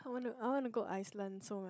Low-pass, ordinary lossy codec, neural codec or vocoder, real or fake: none; none; codec, 16 kHz, 16 kbps, FunCodec, trained on Chinese and English, 50 frames a second; fake